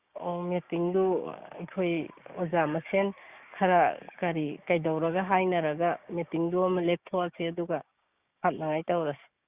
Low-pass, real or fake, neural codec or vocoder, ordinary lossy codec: 3.6 kHz; fake; codec, 16 kHz, 6 kbps, DAC; Opus, 64 kbps